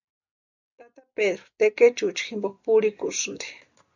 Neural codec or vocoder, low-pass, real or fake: none; 7.2 kHz; real